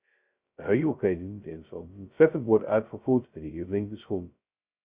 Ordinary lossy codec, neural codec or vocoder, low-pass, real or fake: Opus, 64 kbps; codec, 16 kHz, 0.2 kbps, FocalCodec; 3.6 kHz; fake